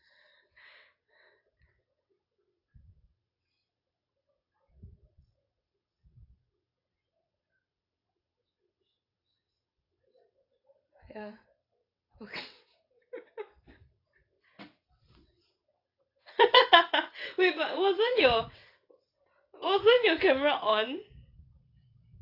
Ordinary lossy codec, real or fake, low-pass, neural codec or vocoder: AAC, 32 kbps; fake; 5.4 kHz; vocoder, 22.05 kHz, 80 mel bands, WaveNeXt